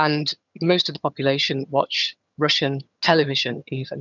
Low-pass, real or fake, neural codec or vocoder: 7.2 kHz; fake; vocoder, 22.05 kHz, 80 mel bands, HiFi-GAN